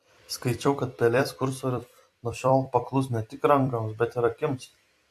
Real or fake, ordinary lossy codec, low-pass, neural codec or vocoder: fake; AAC, 64 kbps; 14.4 kHz; vocoder, 44.1 kHz, 128 mel bands every 256 samples, BigVGAN v2